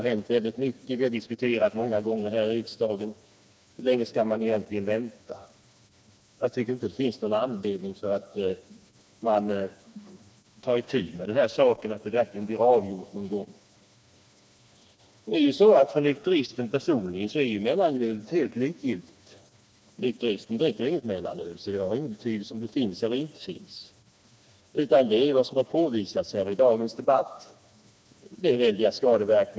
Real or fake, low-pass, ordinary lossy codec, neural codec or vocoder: fake; none; none; codec, 16 kHz, 2 kbps, FreqCodec, smaller model